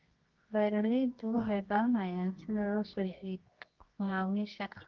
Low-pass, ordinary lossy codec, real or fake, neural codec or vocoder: 7.2 kHz; Opus, 32 kbps; fake; codec, 24 kHz, 0.9 kbps, WavTokenizer, medium music audio release